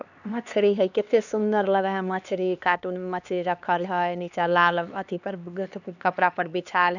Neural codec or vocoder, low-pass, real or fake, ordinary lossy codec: codec, 16 kHz, 2 kbps, X-Codec, HuBERT features, trained on LibriSpeech; 7.2 kHz; fake; none